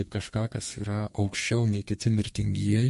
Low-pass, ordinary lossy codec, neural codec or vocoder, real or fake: 14.4 kHz; MP3, 48 kbps; codec, 32 kHz, 1.9 kbps, SNAC; fake